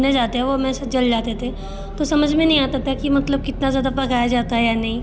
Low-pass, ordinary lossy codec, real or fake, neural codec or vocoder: none; none; real; none